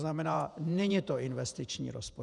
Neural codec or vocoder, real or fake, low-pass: vocoder, 48 kHz, 128 mel bands, Vocos; fake; 10.8 kHz